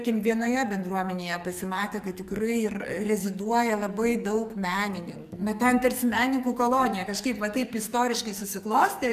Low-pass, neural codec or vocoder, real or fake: 14.4 kHz; codec, 44.1 kHz, 2.6 kbps, SNAC; fake